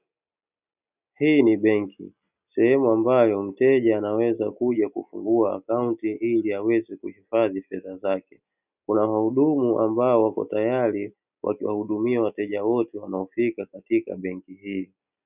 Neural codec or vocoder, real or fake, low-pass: none; real; 3.6 kHz